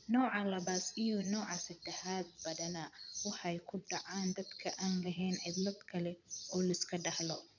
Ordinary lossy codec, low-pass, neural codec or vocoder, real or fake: none; 7.2 kHz; vocoder, 22.05 kHz, 80 mel bands, Vocos; fake